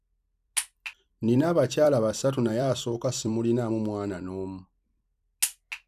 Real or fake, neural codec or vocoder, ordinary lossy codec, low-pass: fake; vocoder, 44.1 kHz, 128 mel bands every 256 samples, BigVGAN v2; none; 14.4 kHz